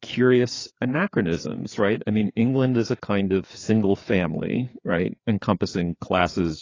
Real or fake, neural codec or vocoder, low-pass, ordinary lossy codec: fake; codec, 16 kHz, 4 kbps, FreqCodec, larger model; 7.2 kHz; AAC, 32 kbps